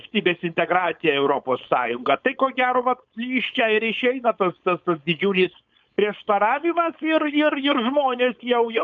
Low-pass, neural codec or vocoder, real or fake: 7.2 kHz; codec, 16 kHz, 4.8 kbps, FACodec; fake